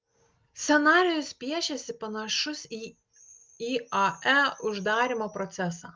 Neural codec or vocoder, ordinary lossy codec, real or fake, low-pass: none; Opus, 24 kbps; real; 7.2 kHz